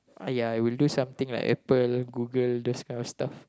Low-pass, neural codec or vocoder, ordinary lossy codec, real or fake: none; none; none; real